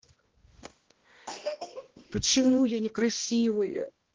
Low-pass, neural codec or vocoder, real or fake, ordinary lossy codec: 7.2 kHz; codec, 16 kHz, 1 kbps, X-Codec, HuBERT features, trained on balanced general audio; fake; Opus, 16 kbps